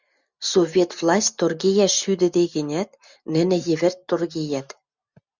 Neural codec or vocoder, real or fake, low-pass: none; real; 7.2 kHz